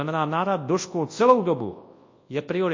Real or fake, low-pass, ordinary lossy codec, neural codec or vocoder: fake; 7.2 kHz; MP3, 32 kbps; codec, 24 kHz, 0.9 kbps, WavTokenizer, large speech release